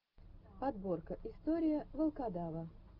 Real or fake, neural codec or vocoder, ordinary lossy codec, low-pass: real; none; Opus, 32 kbps; 5.4 kHz